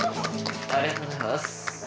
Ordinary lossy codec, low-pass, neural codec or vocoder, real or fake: none; none; none; real